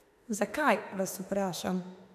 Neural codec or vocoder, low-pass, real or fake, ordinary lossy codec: autoencoder, 48 kHz, 32 numbers a frame, DAC-VAE, trained on Japanese speech; 14.4 kHz; fake; none